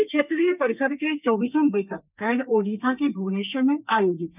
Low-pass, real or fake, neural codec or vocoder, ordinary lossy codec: 3.6 kHz; fake; codec, 32 kHz, 1.9 kbps, SNAC; none